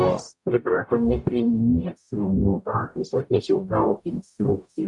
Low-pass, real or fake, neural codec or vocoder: 10.8 kHz; fake; codec, 44.1 kHz, 0.9 kbps, DAC